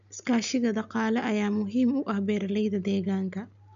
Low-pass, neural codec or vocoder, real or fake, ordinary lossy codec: 7.2 kHz; none; real; none